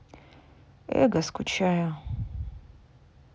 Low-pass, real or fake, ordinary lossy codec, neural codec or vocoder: none; real; none; none